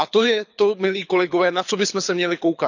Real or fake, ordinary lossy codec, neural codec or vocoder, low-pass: fake; none; codec, 24 kHz, 6 kbps, HILCodec; 7.2 kHz